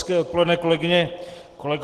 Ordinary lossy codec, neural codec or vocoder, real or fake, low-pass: Opus, 16 kbps; none; real; 14.4 kHz